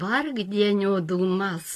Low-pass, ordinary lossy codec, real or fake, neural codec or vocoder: 14.4 kHz; AAC, 48 kbps; real; none